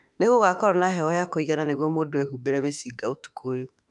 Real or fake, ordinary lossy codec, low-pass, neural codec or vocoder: fake; none; 10.8 kHz; autoencoder, 48 kHz, 32 numbers a frame, DAC-VAE, trained on Japanese speech